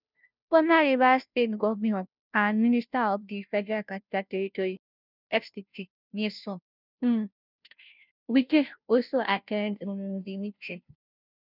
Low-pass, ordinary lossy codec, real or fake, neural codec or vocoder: 5.4 kHz; none; fake; codec, 16 kHz, 0.5 kbps, FunCodec, trained on Chinese and English, 25 frames a second